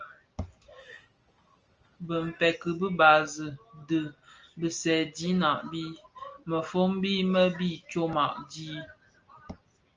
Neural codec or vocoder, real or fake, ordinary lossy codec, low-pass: none; real; Opus, 24 kbps; 7.2 kHz